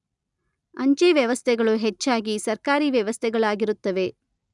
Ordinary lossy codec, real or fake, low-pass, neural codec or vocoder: none; real; 10.8 kHz; none